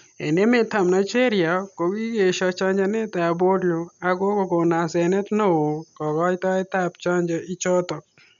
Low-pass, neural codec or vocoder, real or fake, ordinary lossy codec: 7.2 kHz; none; real; none